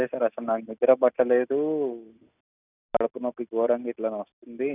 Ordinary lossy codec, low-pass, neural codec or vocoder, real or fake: none; 3.6 kHz; none; real